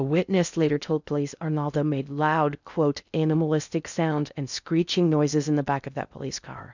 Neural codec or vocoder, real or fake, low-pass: codec, 16 kHz in and 24 kHz out, 0.6 kbps, FocalCodec, streaming, 2048 codes; fake; 7.2 kHz